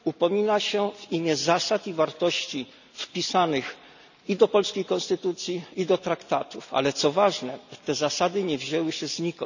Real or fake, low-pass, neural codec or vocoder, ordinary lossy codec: real; 7.2 kHz; none; none